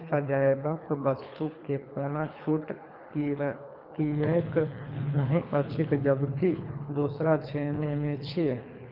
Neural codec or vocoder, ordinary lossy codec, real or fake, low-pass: codec, 24 kHz, 3 kbps, HILCodec; none; fake; 5.4 kHz